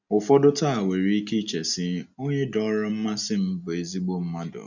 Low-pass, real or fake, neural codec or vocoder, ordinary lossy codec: 7.2 kHz; real; none; none